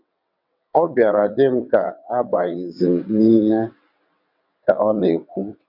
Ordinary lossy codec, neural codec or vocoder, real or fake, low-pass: none; vocoder, 22.05 kHz, 80 mel bands, WaveNeXt; fake; 5.4 kHz